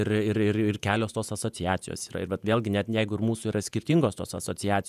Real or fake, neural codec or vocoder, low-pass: fake; vocoder, 48 kHz, 128 mel bands, Vocos; 14.4 kHz